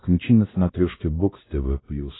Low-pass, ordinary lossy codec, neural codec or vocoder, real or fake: 7.2 kHz; AAC, 16 kbps; codec, 16 kHz, 1 kbps, X-Codec, WavLM features, trained on Multilingual LibriSpeech; fake